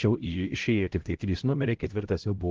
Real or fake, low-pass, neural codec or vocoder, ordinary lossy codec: fake; 7.2 kHz; codec, 16 kHz, 0.5 kbps, X-Codec, HuBERT features, trained on LibriSpeech; Opus, 16 kbps